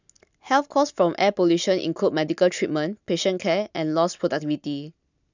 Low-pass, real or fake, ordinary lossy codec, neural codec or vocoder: 7.2 kHz; real; none; none